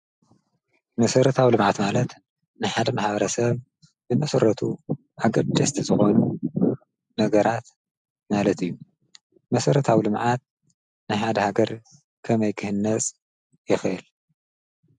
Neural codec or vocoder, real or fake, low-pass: none; real; 10.8 kHz